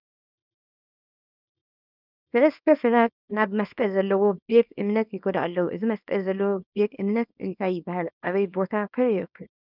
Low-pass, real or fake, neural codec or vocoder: 5.4 kHz; fake; codec, 24 kHz, 0.9 kbps, WavTokenizer, small release